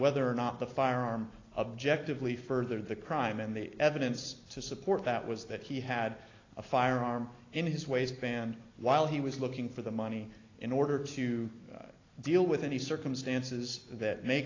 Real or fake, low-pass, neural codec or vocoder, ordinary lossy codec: real; 7.2 kHz; none; AAC, 32 kbps